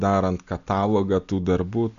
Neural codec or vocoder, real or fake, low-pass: none; real; 7.2 kHz